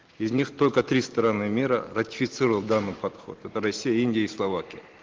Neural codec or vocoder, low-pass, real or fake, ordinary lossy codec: none; 7.2 kHz; real; Opus, 16 kbps